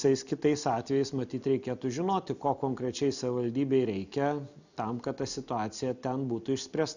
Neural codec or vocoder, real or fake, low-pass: none; real; 7.2 kHz